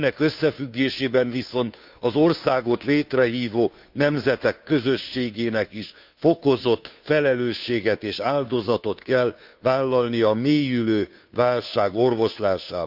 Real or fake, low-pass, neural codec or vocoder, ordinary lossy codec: fake; 5.4 kHz; codec, 16 kHz, 2 kbps, FunCodec, trained on Chinese and English, 25 frames a second; none